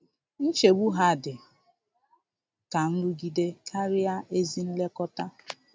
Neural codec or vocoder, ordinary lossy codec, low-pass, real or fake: none; none; none; real